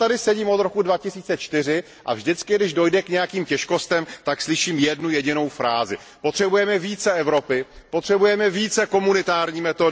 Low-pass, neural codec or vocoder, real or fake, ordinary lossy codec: none; none; real; none